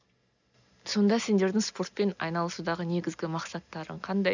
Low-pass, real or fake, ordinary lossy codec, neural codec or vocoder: 7.2 kHz; real; none; none